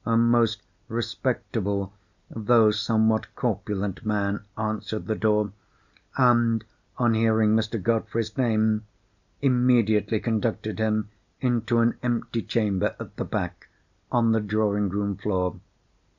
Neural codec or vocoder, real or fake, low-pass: none; real; 7.2 kHz